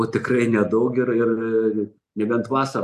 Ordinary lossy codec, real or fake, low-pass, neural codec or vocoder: MP3, 96 kbps; real; 14.4 kHz; none